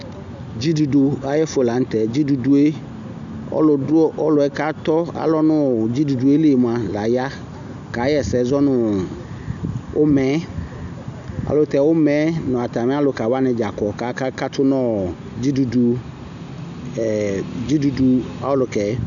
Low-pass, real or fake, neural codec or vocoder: 7.2 kHz; real; none